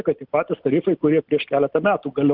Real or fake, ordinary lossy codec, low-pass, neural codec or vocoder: real; Opus, 24 kbps; 5.4 kHz; none